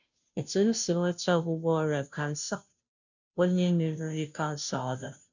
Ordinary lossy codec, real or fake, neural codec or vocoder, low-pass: none; fake; codec, 16 kHz, 0.5 kbps, FunCodec, trained on Chinese and English, 25 frames a second; 7.2 kHz